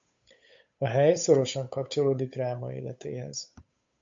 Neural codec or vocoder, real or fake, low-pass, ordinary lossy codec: codec, 16 kHz, 8 kbps, FunCodec, trained on Chinese and English, 25 frames a second; fake; 7.2 kHz; MP3, 48 kbps